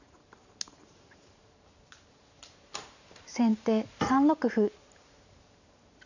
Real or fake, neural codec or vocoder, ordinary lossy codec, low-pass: fake; vocoder, 44.1 kHz, 128 mel bands every 512 samples, BigVGAN v2; none; 7.2 kHz